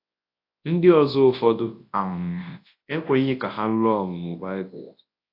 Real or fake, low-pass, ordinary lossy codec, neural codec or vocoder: fake; 5.4 kHz; AAC, 32 kbps; codec, 24 kHz, 0.9 kbps, WavTokenizer, large speech release